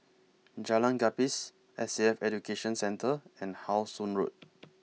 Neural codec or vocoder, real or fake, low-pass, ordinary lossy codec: none; real; none; none